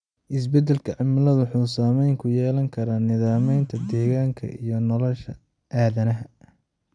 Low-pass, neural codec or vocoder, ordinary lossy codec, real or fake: 9.9 kHz; none; none; real